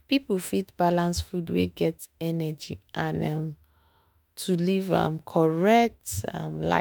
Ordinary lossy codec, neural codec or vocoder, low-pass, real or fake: none; autoencoder, 48 kHz, 32 numbers a frame, DAC-VAE, trained on Japanese speech; none; fake